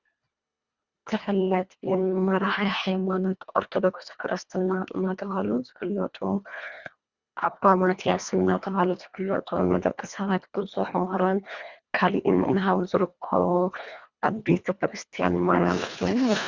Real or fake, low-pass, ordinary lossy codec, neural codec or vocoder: fake; 7.2 kHz; Opus, 64 kbps; codec, 24 kHz, 1.5 kbps, HILCodec